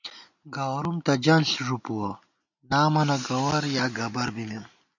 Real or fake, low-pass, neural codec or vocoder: real; 7.2 kHz; none